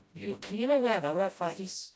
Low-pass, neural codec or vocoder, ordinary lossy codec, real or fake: none; codec, 16 kHz, 0.5 kbps, FreqCodec, smaller model; none; fake